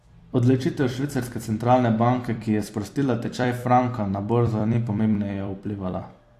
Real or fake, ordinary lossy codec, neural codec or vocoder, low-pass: fake; AAC, 64 kbps; vocoder, 44.1 kHz, 128 mel bands every 256 samples, BigVGAN v2; 14.4 kHz